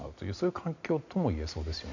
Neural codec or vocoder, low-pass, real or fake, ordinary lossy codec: none; 7.2 kHz; real; none